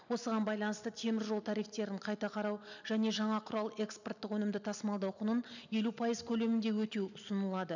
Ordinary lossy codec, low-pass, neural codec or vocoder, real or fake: none; 7.2 kHz; none; real